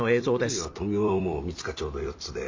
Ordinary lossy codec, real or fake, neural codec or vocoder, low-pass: MP3, 48 kbps; fake; vocoder, 44.1 kHz, 128 mel bands every 256 samples, BigVGAN v2; 7.2 kHz